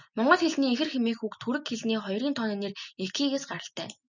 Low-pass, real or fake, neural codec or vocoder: 7.2 kHz; real; none